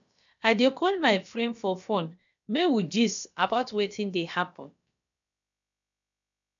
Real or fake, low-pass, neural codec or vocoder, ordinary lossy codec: fake; 7.2 kHz; codec, 16 kHz, about 1 kbps, DyCAST, with the encoder's durations; none